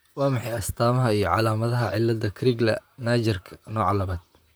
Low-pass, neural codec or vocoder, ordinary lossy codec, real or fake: none; vocoder, 44.1 kHz, 128 mel bands, Pupu-Vocoder; none; fake